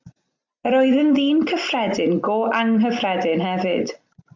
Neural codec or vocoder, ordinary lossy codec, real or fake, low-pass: none; MP3, 64 kbps; real; 7.2 kHz